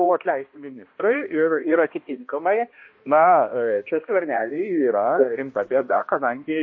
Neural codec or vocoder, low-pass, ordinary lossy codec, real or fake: codec, 16 kHz, 1 kbps, X-Codec, HuBERT features, trained on balanced general audio; 7.2 kHz; MP3, 32 kbps; fake